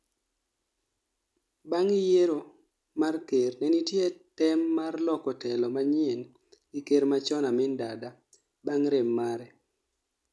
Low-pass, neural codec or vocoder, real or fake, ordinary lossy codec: none; none; real; none